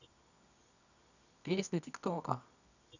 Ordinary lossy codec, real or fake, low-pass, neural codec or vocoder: none; fake; 7.2 kHz; codec, 24 kHz, 0.9 kbps, WavTokenizer, medium music audio release